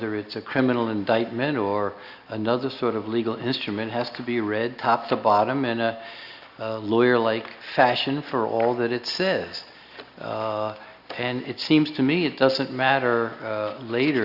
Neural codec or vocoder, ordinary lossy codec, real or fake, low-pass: none; Opus, 64 kbps; real; 5.4 kHz